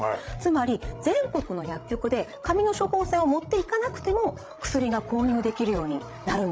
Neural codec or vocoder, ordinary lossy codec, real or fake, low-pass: codec, 16 kHz, 16 kbps, FreqCodec, larger model; none; fake; none